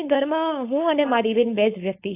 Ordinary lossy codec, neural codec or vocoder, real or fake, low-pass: AAC, 24 kbps; codec, 16 kHz, 4.8 kbps, FACodec; fake; 3.6 kHz